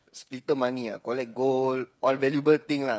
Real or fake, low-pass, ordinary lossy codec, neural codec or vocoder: fake; none; none; codec, 16 kHz, 8 kbps, FreqCodec, smaller model